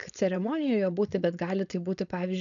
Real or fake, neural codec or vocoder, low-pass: fake; codec, 16 kHz, 4.8 kbps, FACodec; 7.2 kHz